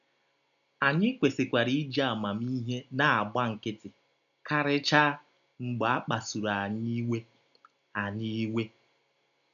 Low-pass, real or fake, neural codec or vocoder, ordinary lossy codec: 7.2 kHz; real; none; none